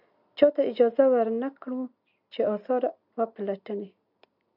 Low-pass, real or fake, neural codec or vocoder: 5.4 kHz; real; none